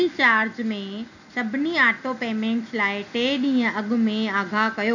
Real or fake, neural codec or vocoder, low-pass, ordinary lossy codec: real; none; 7.2 kHz; none